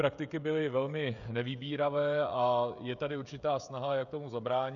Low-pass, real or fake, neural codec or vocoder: 7.2 kHz; fake; codec, 16 kHz, 16 kbps, FreqCodec, smaller model